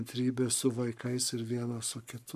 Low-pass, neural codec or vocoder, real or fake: 14.4 kHz; codec, 44.1 kHz, 7.8 kbps, Pupu-Codec; fake